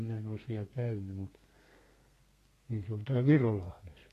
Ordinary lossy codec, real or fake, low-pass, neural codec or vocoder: AAC, 48 kbps; fake; 14.4 kHz; codec, 32 kHz, 1.9 kbps, SNAC